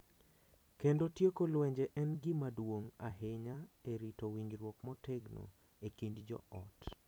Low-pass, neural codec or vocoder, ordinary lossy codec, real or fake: none; vocoder, 44.1 kHz, 128 mel bands every 256 samples, BigVGAN v2; none; fake